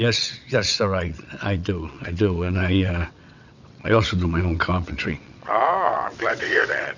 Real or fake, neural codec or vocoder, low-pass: fake; vocoder, 22.05 kHz, 80 mel bands, WaveNeXt; 7.2 kHz